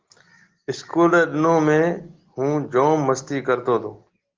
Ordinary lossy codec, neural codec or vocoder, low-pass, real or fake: Opus, 16 kbps; none; 7.2 kHz; real